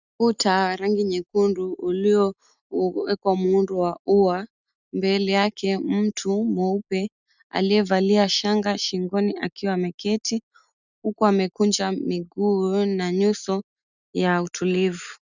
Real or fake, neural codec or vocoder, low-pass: real; none; 7.2 kHz